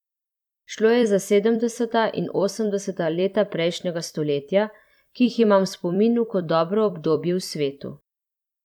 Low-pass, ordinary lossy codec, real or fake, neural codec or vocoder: 19.8 kHz; none; fake; vocoder, 44.1 kHz, 128 mel bands every 256 samples, BigVGAN v2